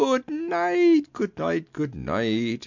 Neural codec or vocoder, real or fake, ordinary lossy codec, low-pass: none; real; MP3, 64 kbps; 7.2 kHz